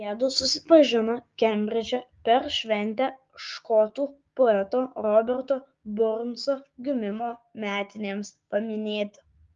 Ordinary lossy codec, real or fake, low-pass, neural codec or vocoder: Opus, 32 kbps; fake; 7.2 kHz; codec, 16 kHz, 6 kbps, DAC